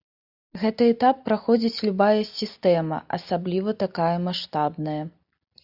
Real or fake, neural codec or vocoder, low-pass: real; none; 5.4 kHz